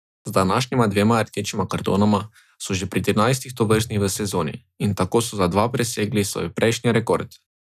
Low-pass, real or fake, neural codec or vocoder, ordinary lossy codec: 14.4 kHz; real; none; none